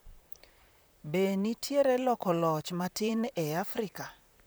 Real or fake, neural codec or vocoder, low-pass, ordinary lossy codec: fake; vocoder, 44.1 kHz, 128 mel bands, Pupu-Vocoder; none; none